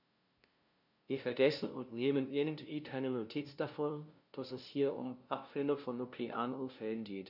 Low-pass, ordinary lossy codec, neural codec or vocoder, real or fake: 5.4 kHz; none; codec, 16 kHz, 0.5 kbps, FunCodec, trained on LibriTTS, 25 frames a second; fake